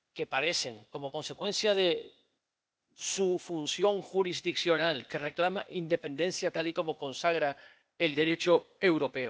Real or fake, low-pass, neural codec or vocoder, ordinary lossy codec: fake; none; codec, 16 kHz, 0.8 kbps, ZipCodec; none